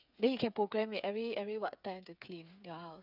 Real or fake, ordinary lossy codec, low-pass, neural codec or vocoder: fake; none; 5.4 kHz; codec, 16 kHz, 8 kbps, FreqCodec, smaller model